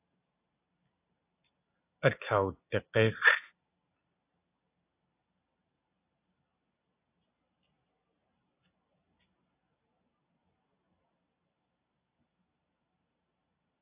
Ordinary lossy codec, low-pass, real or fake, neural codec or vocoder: AAC, 24 kbps; 3.6 kHz; real; none